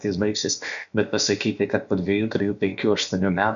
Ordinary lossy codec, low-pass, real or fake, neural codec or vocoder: MP3, 96 kbps; 7.2 kHz; fake; codec, 16 kHz, about 1 kbps, DyCAST, with the encoder's durations